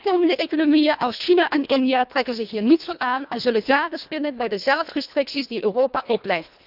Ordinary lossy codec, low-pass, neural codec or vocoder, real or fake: none; 5.4 kHz; codec, 24 kHz, 1.5 kbps, HILCodec; fake